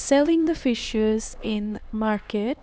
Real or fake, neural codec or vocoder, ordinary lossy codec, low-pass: fake; codec, 16 kHz, 2 kbps, X-Codec, HuBERT features, trained on LibriSpeech; none; none